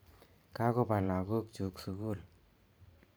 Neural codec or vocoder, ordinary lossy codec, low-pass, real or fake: vocoder, 44.1 kHz, 128 mel bands every 512 samples, BigVGAN v2; none; none; fake